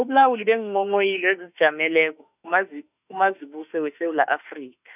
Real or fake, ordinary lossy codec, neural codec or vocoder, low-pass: fake; none; autoencoder, 48 kHz, 32 numbers a frame, DAC-VAE, trained on Japanese speech; 3.6 kHz